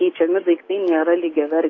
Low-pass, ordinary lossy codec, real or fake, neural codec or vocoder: 7.2 kHz; AAC, 32 kbps; real; none